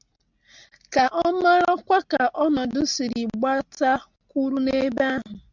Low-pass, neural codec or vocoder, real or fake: 7.2 kHz; none; real